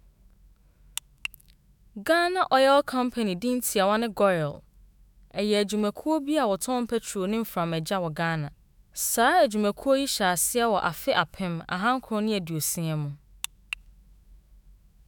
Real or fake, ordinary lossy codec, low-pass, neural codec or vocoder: fake; none; 19.8 kHz; autoencoder, 48 kHz, 128 numbers a frame, DAC-VAE, trained on Japanese speech